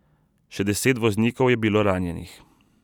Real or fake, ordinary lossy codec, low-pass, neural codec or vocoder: real; none; 19.8 kHz; none